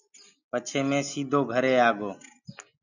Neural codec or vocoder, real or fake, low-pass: none; real; 7.2 kHz